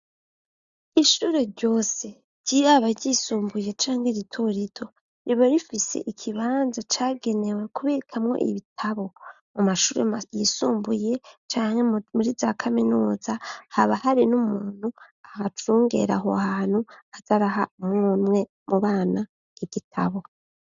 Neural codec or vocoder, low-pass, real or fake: none; 7.2 kHz; real